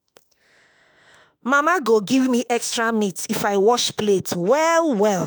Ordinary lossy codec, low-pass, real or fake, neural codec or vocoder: none; none; fake; autoencoder, 48 kHz, 32 numbers a frame, DAC-VAE, trained on Japanese speech